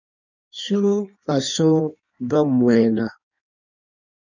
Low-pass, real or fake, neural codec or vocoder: 7.2 kHz; fake; codec, 16 kHz in and 24 kHz out, 1.1 kbps, FireRedTTS-2 codec